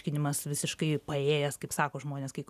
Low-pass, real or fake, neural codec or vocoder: 14.4 kHz; real; none